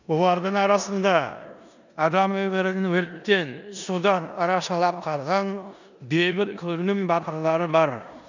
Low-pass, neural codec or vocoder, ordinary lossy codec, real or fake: 7.2 kHz; codec, 16 kHz in and 24 kHz out, 0.9 kbps, LongCat-Audio-Codec, four codebook decoder; none; fake